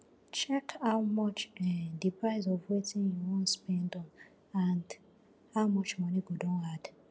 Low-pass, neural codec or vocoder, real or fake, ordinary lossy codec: none; none; real; none